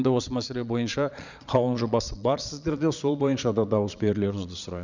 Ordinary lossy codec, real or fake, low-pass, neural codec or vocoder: none; fake; 7.2 kHz; vocoder, 22.05 kHz, 80 mel bands, Vocos